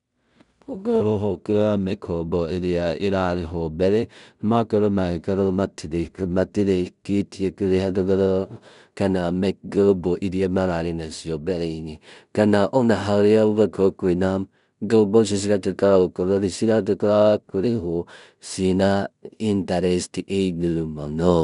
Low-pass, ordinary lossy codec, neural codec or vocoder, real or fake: 10.8 kHz; none; codec, 16 kHz in and 24 kHz out, 0.4 kbps, LongCat-Audio-Codec, two codebook decoder; fake